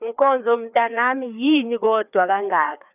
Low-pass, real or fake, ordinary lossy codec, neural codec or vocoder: 3.6 kHz; fake; none; codec, 16 kHz, 2 kbps, FreqCodec, larger model